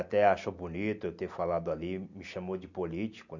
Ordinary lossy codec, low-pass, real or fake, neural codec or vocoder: none; 7.2 kHz; real; none